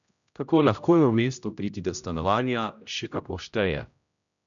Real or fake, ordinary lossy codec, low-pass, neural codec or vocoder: fake; none; 7.2 kHz; codec, 16 kHz, 0.5 kbps, X-Codec, HuBERT features, trained on general audio